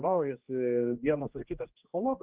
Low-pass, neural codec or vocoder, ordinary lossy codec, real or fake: 3.6 kHz; codec, 16 kHz, 1 kbps, X-Codec, HuBERT features, trained on general audio; Opus, 32 kbps; fake